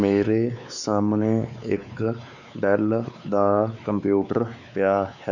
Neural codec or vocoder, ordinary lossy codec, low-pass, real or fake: codec, 16 kHz, 4 kbps, X-Codec, WavLM features, trained on Multilingual LibriSpeech; none; 7.2 kHz; fake